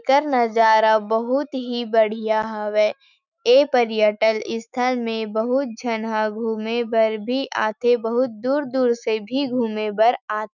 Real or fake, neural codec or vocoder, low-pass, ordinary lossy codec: fake; autoencoder, 48 kHz, 128 numbers a frame, DAC-VAE, trained on Japanese speech; 7.2 kHz; none